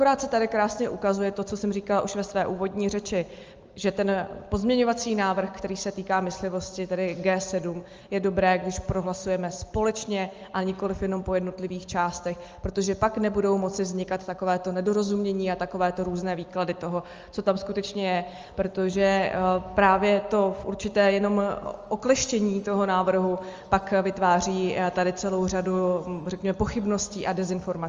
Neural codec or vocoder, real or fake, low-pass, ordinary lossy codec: none; real; 7.2 kHz; Opus, 32 kbps